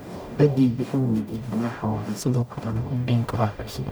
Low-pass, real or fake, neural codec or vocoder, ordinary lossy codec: none; fake; codec, 44.1 kHz, 0.9 kbps, DAC; none